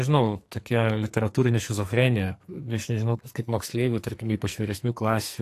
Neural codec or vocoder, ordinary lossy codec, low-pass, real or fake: codec, 44.1 kHz, 2.6 kbps, SNAC; AAC, 64 kbps; 14.4 kHz; fake